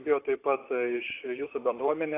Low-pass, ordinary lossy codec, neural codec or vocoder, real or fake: 3.6 kHz; AAC, 24 kbps; vocoder, 44.1 kHz, 128 mel bands, Pupu-Vocoder; fake